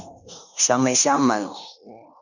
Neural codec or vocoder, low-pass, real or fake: codec, 16 kHz in and 24 kHz out, 0.9 kbps, LongCat-Audio-Codec, fine tuned four codebook decoder; 7.2 kHz; fake